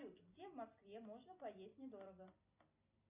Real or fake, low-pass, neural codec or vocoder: real; 3.6 kHz; none